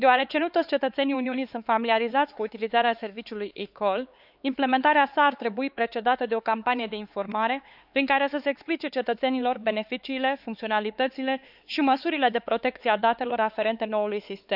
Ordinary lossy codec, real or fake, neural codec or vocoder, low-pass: none; fake; codec, 16 kHz, 4 kbps, X-Codec, HuBERT features, trained on LibriSpeech; 5.4 kHz